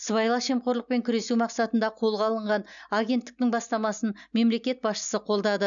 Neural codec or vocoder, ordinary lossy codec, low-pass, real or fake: none; none; 7.2 kHz; real